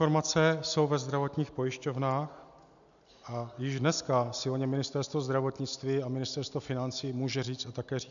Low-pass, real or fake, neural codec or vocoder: 7.2 kHz; real; none